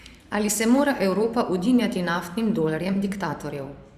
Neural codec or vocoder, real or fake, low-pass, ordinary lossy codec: vocoder, 44.1 kHz, 128 mel bands every 512 samples, BigVGAN v2; fake; 14.4 kHz; Opus, 64 kbps